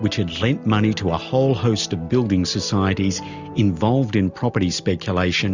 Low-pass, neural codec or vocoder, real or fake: 7.2 kHz; none; real